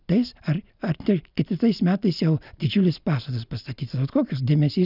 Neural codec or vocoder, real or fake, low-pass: none; real; 5.4 kHz